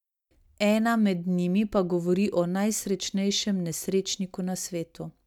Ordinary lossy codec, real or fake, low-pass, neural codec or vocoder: none; real; 19.8 kHz; none